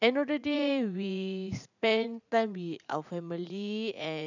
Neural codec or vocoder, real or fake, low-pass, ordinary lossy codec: vocoder, 44.1 kHz, 80 mel bands, Vocos; fake; 7.2 kHz; none